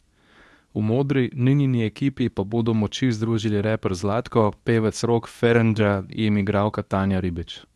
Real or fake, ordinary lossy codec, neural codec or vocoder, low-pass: fake; none; codec, 24 kHz, 0.9 kbps, WavTokenizer, medium speech release version 2; none